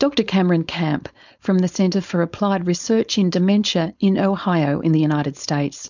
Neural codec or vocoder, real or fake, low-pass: codec, 16 kHz, 4.8 kbps, FACodec; fake; 7.2 kHz